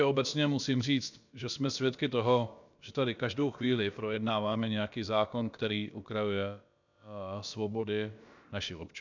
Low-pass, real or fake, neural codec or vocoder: 7.2 kHz; fake; codec, 16 kHz, about 1 kbps, DyCAST, with the encoder's durations